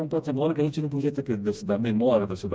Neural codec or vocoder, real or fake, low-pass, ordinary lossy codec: codec, 16 kHz, 1 kbps, FreqCodec, smaller model; fake; none; none